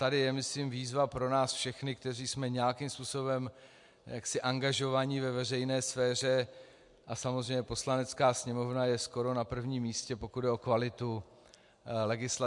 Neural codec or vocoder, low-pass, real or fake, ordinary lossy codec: none; 10.8 kHz; real; MP3, 64 kbps